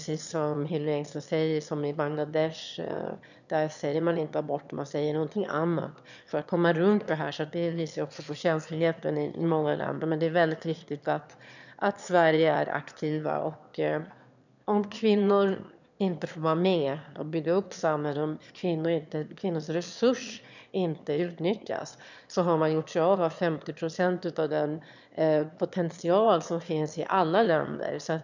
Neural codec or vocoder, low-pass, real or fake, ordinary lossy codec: autoencoder, 22.05 kHz, a latent of 192 numbers a frame, VITS, trained on one speaker; 7.2 kHz; fake; none